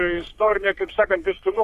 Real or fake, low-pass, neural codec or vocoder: fake; 14.4 kHz; codec, 44.1 kHz, 3.4 kbps, Pupu-Codec